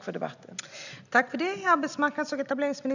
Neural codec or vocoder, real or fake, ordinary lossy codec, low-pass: none; real; none; 7.2 kHz